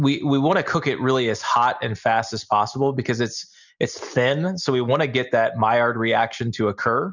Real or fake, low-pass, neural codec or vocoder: real; 7.2 kHz; none